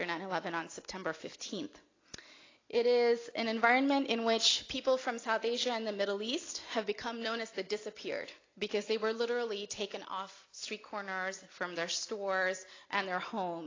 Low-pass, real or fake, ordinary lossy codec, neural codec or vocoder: 7.2 kHz; real; AAC, 32 kbps; none